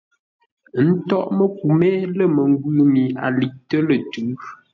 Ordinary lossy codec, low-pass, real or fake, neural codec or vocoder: MP3, 64 kbps; 7.2 kHz; real; none